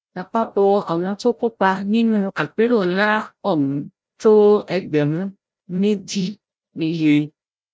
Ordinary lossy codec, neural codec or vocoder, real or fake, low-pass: none; codec, 16 kHz, 0.5 kbps, FreqCodec, larger model; fake; none